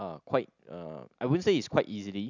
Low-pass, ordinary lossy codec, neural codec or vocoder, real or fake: 7.2 kHz; none; none; real